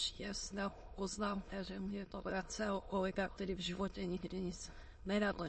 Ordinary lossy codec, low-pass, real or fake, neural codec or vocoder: MP3, 32 kbps; 9.9 kHz; fake; autoencoder, 22.05 kHz, a latent of 192 numbers a frame, VITS, trained on many speakers